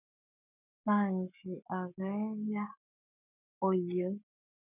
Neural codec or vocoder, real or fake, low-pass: codec, 44.1 kHz, 7.8 kbps, Pupu-Codec; fake; 3.6 kHz